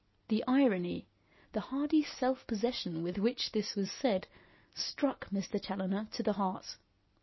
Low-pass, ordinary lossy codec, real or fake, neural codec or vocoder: 7.2 kHz; MP3, 24 kbps; real; none